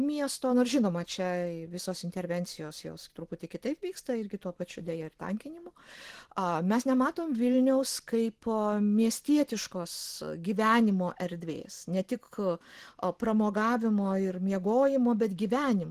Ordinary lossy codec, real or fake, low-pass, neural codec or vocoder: Opus, 16 kbps; real; 14.4 kHz; none